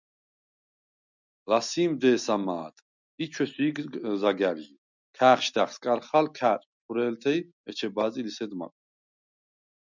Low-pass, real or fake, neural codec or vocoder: 7.2 kHz; real; none